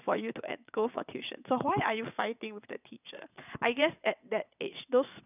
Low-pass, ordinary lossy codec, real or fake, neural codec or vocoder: 3.6 kHz; none; fake; vocoder, 22.05 kHz, 80 mel bands, WaveNeXt